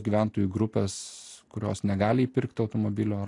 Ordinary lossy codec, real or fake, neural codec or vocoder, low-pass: AAC, 48 kbps; real; none; 10.8 kHz